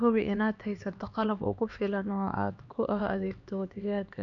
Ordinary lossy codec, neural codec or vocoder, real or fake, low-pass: none; codec, 16 kHz, 2 kbps, X-Codec, HuBERT features, trained on LibriSpeech; fake; 7.2 kHz